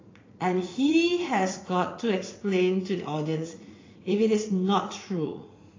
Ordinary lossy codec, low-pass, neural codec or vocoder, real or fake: AAC, 32 kbps; 7.2 kHz; vocoder, 44.1 kHz, 80 mel bands, Vocos; fake